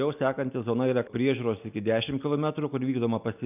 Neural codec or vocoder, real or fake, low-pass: none; real; 3.6 kHz